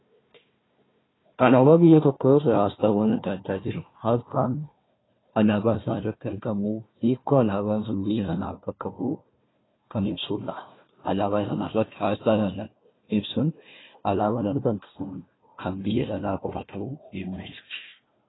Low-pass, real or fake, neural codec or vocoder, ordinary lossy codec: 7.2 kHz; fake; codec, 16 kHz, 1 kbps, FunCodec, trained on Chinese and English, 50 frames a second; AAC, 16 kbps